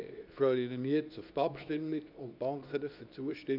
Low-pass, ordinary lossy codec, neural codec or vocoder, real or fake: 5.4 kHz; none; codec, 24 kHz, 0.9 kbps, WavTokenizer, medium speech release version 2; fake